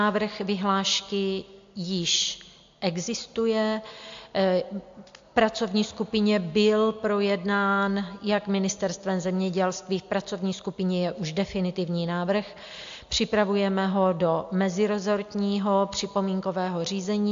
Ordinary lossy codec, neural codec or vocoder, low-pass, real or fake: AAC, 64 kbps; none; 7.2 kHz; real